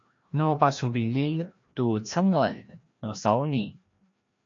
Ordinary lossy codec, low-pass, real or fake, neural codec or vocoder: MP3, 48 kbps; 7.2 kHz; fake; codec, 16 kHz, 1 kbps, FreqCodec, larger model